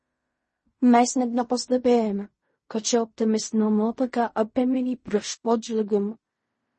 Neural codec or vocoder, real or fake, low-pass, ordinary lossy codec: codec, 16 kHz in and 24 kHz out, 0.4 kbps, LongCat-Audio-Codec, fine tuned four codebook decoder; fake; 10.8 kHz; MP3, 32 kbps